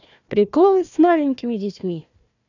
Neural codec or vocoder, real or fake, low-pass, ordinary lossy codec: codec, 16 kHz, 1 kbps, FunCodec, trained on Chinese and English, 50 frames a second; fake; 7.2 kHz; none